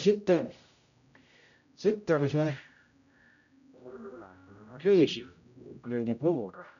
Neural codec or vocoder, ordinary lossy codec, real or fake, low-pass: codec, 16 kHz, 0.5 kbps, X-Codec, HuBERT features, trained on general audio; none; fake; 7.2 kHz